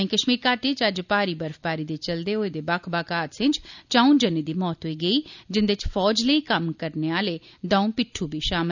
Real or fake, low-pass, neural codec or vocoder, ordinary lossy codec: real; 7.2 kHz; none; none